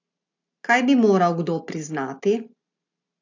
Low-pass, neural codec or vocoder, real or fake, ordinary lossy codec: 7.2 kHz; none; real; AAC, 48 kbps